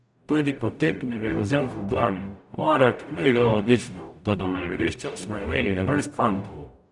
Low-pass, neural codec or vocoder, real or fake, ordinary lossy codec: 10.8 kHz; codec, 44.1 kHz, 0.9 kbps, DAC; fake; none